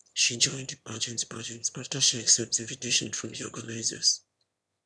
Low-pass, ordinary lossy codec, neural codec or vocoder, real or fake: none; none; autoencoder, 22.05 kHz, a latent of 192 numbers a frame, VITS, trained on one speaker; fake